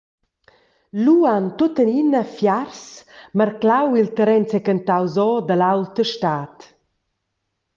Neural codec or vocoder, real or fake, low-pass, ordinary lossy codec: none; real; 7.2 kHz; Opus, 32 kbps